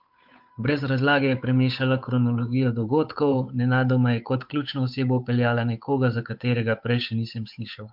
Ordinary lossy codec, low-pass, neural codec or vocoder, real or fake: MP3, 48 kbps; 5.4 kHz; codec, 16 kHz, 8 kbps, FunCodec, trained on Chinese and English, 25 frames a second; fake